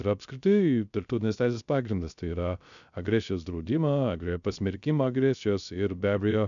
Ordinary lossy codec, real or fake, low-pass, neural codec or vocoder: MP3, 96 kbps; fake; 7.2 kHz; codec, 16 kHz, 0.3 kbps, FocalCodec